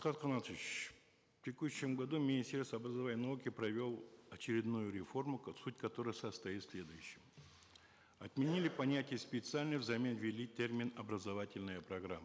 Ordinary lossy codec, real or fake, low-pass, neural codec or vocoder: none; real; none; none